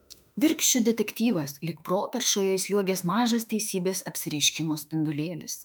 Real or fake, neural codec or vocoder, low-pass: fake; autoencoder, 48 kHz, 32 numbers a frame, DAC-VAE, trained on Japanese speech; 19.8 kHz